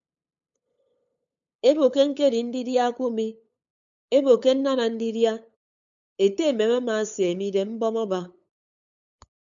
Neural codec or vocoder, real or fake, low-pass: codec, 16 kHz, 8 kbps, FunCodec, trained on LibriTTS, 25 frames a second; fake; 7.2 kHz